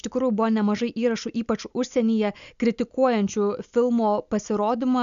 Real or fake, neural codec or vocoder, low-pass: real; none; 7.2 kHz